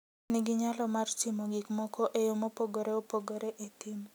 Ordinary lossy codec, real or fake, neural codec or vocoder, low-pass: none; real; none; none